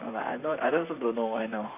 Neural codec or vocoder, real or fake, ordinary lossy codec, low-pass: vocoder, 44.1 kHz, 128 mel bands, Pupu-Vocoder; fake; none; 3.6 kHz